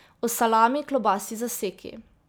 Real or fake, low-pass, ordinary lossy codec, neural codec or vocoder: real; none; none; none